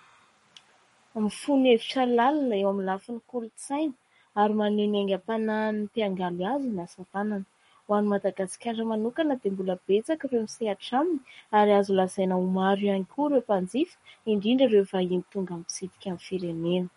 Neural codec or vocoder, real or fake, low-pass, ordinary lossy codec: codec, 44.1 kHz, 7.8 kbps, Pupu-Codec; fake; 19.8 kHz; MP3, 48 kbps